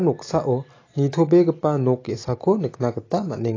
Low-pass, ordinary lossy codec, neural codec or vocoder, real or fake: 7.2 kHz; AAC, 32 kbps; none; real